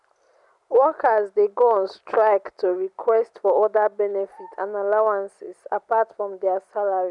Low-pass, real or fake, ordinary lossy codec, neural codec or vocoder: 10.8 kHz; real; none; none